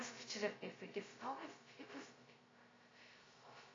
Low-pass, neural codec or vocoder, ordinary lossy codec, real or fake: 7.2 kHz; codec, 16 kHz, 0.2 kbps, FocalCodec; AAC, 32 kbps; fake